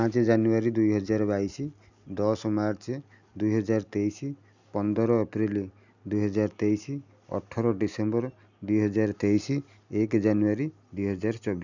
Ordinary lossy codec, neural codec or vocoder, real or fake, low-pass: none; none; real; 7.2 kHz